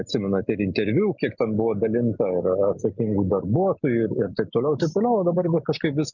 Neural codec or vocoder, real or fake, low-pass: none; real; 7.2 kHz